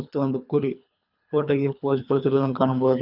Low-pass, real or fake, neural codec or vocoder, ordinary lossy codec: 5.4 kHz; fake; codec, 24 kHz, 3 kbps, HILCodec; none